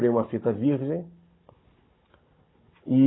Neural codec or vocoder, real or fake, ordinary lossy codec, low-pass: codec, 16 kHz, 16 kbps, FunCodec, trained on Chinese and English, 50 frames a second; fake; AAC, 16 kbps; 7.2 kHz